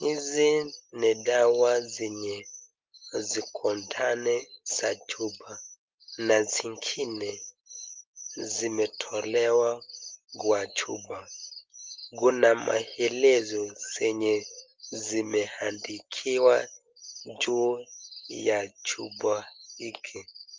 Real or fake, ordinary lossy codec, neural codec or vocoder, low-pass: real; Opus, 32 kbps; none; 7.2 kHz